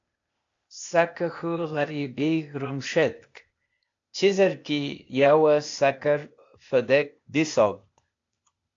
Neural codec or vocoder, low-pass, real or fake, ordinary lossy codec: codec, 16 kHz, 0.8 kbps, ZipCodec; 7.2 kHz; fake; AAC, 48 kbps